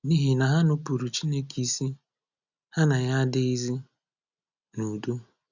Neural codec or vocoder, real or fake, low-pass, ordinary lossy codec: none; real; 7.2 kHz; none